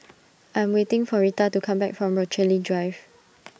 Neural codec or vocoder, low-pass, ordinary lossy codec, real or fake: none; none; none; real